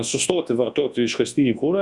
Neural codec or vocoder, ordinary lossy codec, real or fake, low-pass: codec, 24 kHz, 0.9 kbps, WavTokenizer, large speech release; Opus, 64 kbps; fake; 10.8 kHz